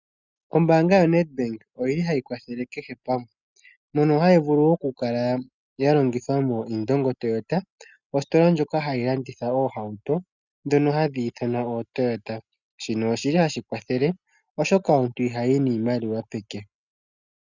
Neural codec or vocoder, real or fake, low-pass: none; real; 7.2 kHz